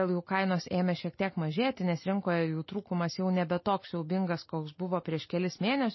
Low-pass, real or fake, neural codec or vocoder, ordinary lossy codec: 7.2 kHz; real; none; MP3, 24 kbps